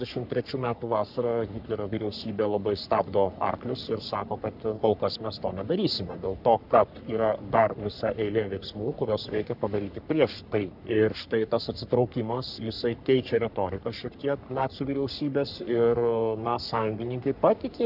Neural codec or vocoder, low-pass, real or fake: codec, 44.1 kHz, 3.4 kbps, Pupu-Codec; 5.4 kHz; fake